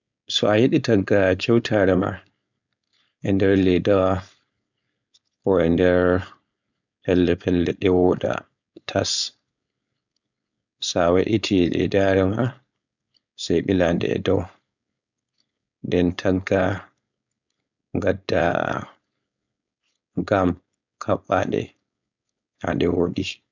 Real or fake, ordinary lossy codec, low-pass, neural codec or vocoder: fake; none; 7.2 kHz; codec, 16 kHz, 4.8 kbps, FACodec